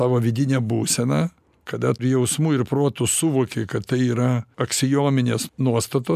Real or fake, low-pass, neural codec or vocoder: real; 14.4 kHz; none